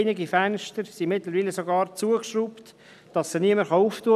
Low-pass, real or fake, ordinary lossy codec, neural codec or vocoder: 14.4 kHz; real; none; none